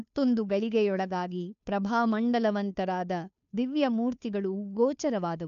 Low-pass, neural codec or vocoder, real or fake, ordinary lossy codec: 7.2 kHz; codec, 16 kHz, 2 kbps, FunCodec, trained on Chinese and English, 25 frames a second; fake; none